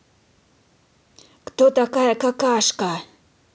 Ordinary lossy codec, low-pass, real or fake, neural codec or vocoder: none; none; real; none